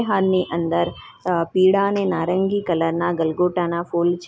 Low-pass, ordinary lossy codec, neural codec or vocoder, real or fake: none; none; none; real